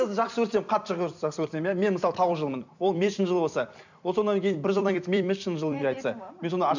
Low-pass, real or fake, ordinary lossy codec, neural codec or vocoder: 7.2 kHz; fake; none; vocoder, 44.1 kHz, 128 mel bands every 256 samples, BigVGAN v2